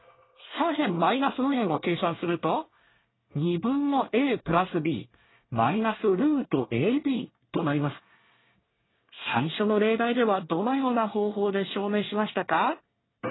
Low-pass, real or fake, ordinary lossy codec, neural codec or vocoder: 7.2 kHz; fake; AAC, 16 kbps; codec, 24 kHz, 1 kbps, SNAC